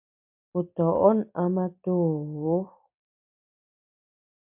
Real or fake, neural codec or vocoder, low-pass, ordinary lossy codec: fake; vocoder, 44.1 kHz, 128 mel bands every 256 samples, BigVGAN v2; 3.6 kHz; MP3, 32 kbps